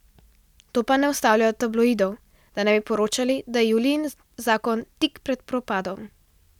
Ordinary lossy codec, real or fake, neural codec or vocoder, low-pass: none; real; none; 19.8 kHz